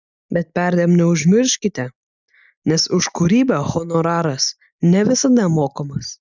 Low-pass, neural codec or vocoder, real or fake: 7.2 kHz; none; real